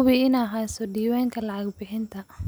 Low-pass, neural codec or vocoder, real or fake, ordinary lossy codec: none; none; real; none